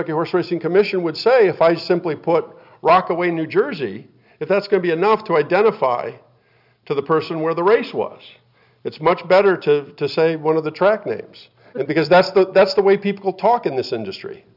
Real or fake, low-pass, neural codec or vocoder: real; 5.4 kHz; none